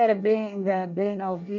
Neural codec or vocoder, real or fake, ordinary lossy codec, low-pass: codec, 24 kHz, 1 kbps, SNAC; fake; none; 7.2 kHz